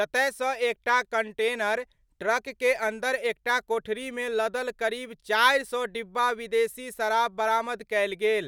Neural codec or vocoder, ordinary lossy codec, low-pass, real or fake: none; none; 19.8 kHz; real